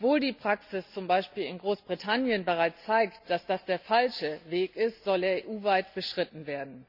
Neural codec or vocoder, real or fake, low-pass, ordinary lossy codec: none; real; 5.4 kHz; none